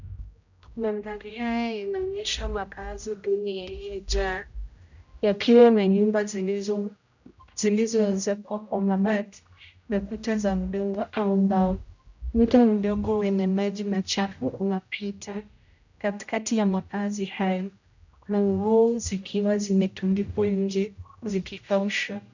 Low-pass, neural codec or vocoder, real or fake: 7.2 kHz; codec, 16 kHz, 0.5 kbps, X-Codec, HuBERT features, trained on general audio; fake